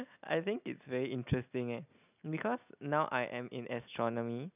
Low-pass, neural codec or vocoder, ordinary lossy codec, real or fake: 3.6 kHz; none; none; real